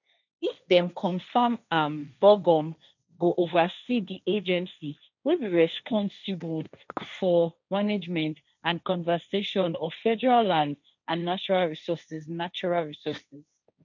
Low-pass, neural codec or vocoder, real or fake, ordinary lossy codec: 7.2 kHz; codec, 16 kHz, 1.1 kbps, Voila-Tokenizer; fake; none